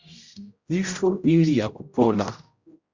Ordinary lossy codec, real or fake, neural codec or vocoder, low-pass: Opus, 64 kbps; fake; codec, 16 kHz, 0.5 kbps, X-Codec, HuBERT features, trained on general audio; 7.2 kHz